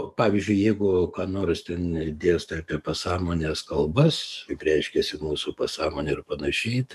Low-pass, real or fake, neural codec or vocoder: 14.4 kHz; fake; codec, 44.1 kHz, 7.8 kbps, DAC